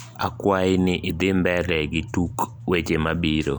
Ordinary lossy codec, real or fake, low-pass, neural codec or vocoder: none; fake; none; vocoder, 44.1 kHz, 128 mel bands every 256 samples, BigVGAN v2